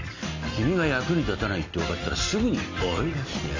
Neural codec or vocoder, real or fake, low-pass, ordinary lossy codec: none; real; 7.2 kHz; AAC, 32 kbps